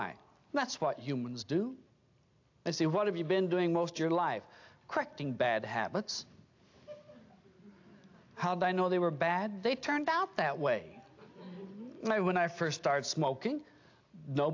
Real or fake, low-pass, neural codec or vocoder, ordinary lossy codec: real; 7.2 kHz; none; AAC, 48 kbps